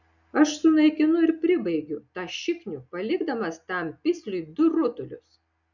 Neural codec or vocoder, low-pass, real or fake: none; 7.2 kHz; real